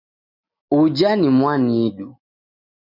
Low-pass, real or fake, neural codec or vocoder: 5.4 kHz; real; none